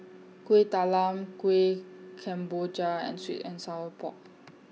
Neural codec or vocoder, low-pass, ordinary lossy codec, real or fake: none; none; none; real